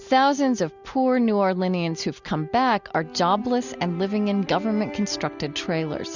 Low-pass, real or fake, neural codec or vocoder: 7.2 kHz; real; none